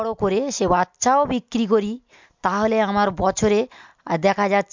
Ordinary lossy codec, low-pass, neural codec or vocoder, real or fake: MP3, 64 kbps; 7.2 kHz; none; real